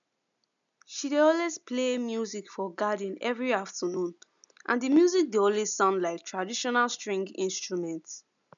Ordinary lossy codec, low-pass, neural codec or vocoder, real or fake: none; 7.2 kHz; none; real